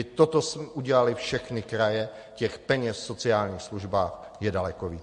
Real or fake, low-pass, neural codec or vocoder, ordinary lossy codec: real; 14.4 kHz; none; MP3, 48 kbps